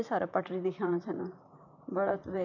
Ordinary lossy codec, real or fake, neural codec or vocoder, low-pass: none; fake; vocoder, 44.1 kHz, 128 mel bands, Pupu-Vocoder; 7.2 kHz